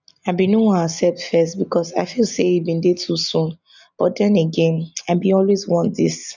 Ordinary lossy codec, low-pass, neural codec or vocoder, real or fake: none; 7.2 kHz; none; real